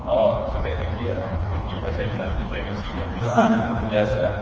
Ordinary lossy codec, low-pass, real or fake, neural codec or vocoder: Opus, 24 kbps; 7.2 kHz; fake; codec, 16 kHz, 2 kbps, FreqCodec, smaller model